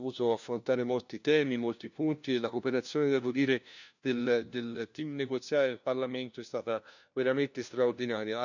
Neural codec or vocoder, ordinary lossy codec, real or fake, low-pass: codec, 16 kHz, 1 kbps, FunCodec, trained on LibriTTS, 50 frames a second; none; fake; 7.2 kHz